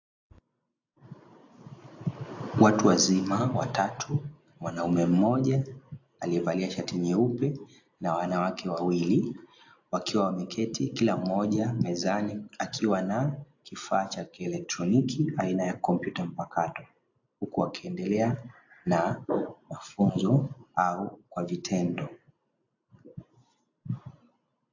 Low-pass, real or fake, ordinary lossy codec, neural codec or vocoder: 7.2 kHz; real; AAC, 48 kbps; none